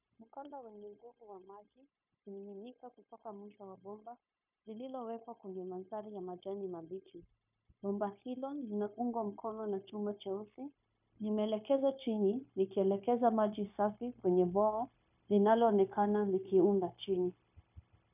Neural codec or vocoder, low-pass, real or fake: codec, 16 kHz, 0.9 kbps, LongCat-Audio-Codec; 3.6 kHz; fake